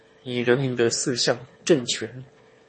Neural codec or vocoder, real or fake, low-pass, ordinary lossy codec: autoencoder, 22.05 kHz, a latent of 192 numbers a frame, VITS, trained on one speaker; fake; 9.9 kHz; MP3, 32 kbps